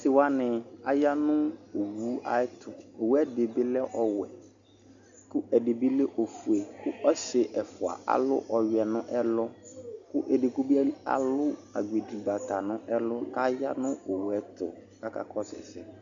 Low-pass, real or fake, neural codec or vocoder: 7.2 kHz; real; none